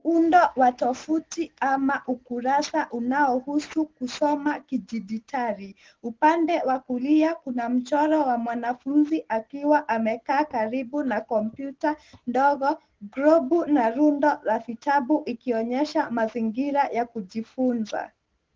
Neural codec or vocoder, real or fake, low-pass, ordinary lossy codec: none; real; 7.2 kHz; Opus, 16 kbps